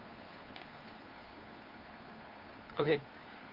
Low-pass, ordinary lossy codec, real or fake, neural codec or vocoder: 5.4 kHz; Opus, 32 kbps; fake; codec, 16 kHz, 2 kbps, FunCodec, trained on Chinese and English, 25 frames a second